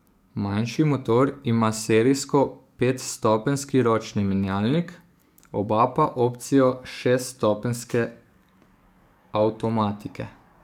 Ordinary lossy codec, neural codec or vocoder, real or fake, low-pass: none; codec, 44.1 kHz, 7.8 kbps, DAC; fake; 19.8 kHz